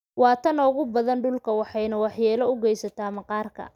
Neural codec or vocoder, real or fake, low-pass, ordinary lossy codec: none; real; 19.8 kHz; none